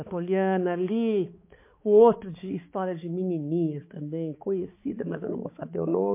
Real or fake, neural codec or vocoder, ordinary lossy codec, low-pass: fake; codec, 16 kHz, 4 kbps, X-Codec, HuBERT features, trained on balanced general audio; MP3, 24 kbps; 3.6 kHz